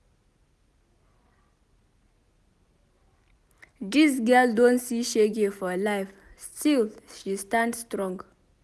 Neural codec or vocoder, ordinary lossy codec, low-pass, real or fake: none; none; none; real